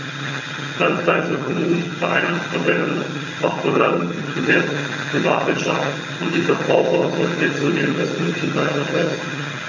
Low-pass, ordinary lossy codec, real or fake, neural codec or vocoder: 7.2 kHz; none; fake; vocoder, 22.05 kHz, 80 mel bands, HiFi-GAN